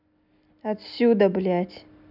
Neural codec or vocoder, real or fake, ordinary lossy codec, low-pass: none; real; none; 5.4 kHz